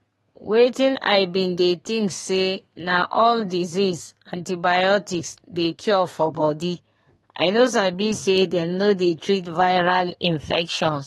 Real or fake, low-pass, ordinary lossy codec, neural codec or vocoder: fake; 14.4 kHz; AAC, 32 kbps; codec, 32 kHz, 1.9 kbps, SNAC